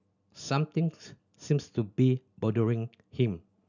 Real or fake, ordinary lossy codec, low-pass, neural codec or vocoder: real; none; 7.2 kHz; none